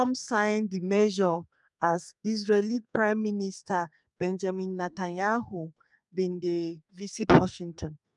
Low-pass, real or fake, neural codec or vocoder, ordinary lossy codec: 10.8 kHz; fake; codec, 32 kHz, 1.9 kbps, SNAC; MP3, 96 kbps